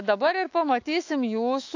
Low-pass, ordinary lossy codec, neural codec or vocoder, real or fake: 7.2 kHz; AAC, 48 kbps; autoencoder, 48 kHz, 128 numbers a frame, DAC-VAE, trained on Japanese speech; fake